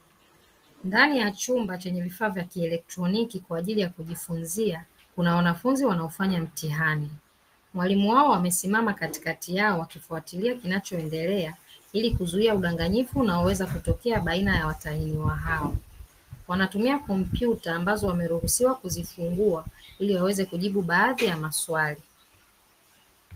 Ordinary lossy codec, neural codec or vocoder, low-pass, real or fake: Opus, 24 kbps; none; 14.4 kHz; real